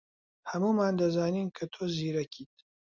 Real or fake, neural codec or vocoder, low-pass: real; none; 7.2 kHz